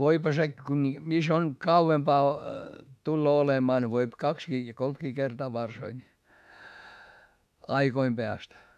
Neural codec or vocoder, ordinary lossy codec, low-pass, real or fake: autoencoder, 48 kHz, 32 numbers a frame, DAC-VAE, trained on Japanese speech; none; 14.4 kHz; fake